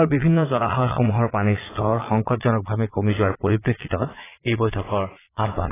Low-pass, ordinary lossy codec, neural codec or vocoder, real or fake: 3.6 kHz; AAC, 16 kbps; vocoder, 22.05 kHz, 80 mel bands, Vocos; fake